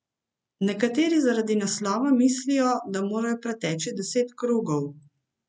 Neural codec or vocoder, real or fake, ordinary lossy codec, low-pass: none; real; none; none